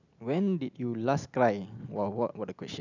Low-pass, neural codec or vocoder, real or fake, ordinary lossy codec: 7.2 kHz; none; real; none